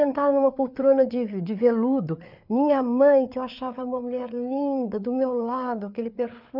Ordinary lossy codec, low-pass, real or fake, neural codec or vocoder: none; 5.4 kHz; fake; codec, 16 kHz, 4 kbps, FreqCodec, larger model